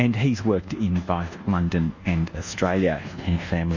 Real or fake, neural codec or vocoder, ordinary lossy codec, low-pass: fake; codec, 24 kHz, 1.2 kbps, DualCodec; Opus, 64 kbps; 7.2 kHz